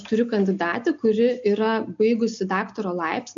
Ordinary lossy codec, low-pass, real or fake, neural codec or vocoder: AAC, 64 kbps; 7.2 kHz; real; none